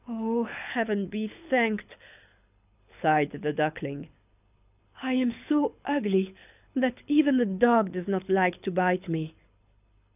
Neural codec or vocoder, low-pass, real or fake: codec, 24 kHz, 6 kbps, HILCodec; 3.6 kHz; fake